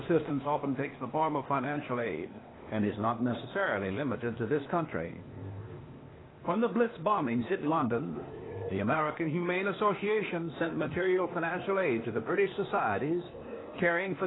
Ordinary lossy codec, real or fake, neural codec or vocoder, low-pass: AAC, 16 kbps; fake; codec, 16 kHz, 0.8 kbps, ZipCodec; 7.2 kHz